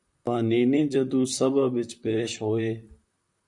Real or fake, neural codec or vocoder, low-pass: fake; vocoder, 44.1 kHz, 128 mel bands, Pupu-Vocoder; 10.8 kHz